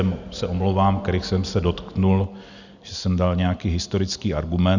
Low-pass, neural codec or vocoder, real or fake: 7.2 kHz; none; real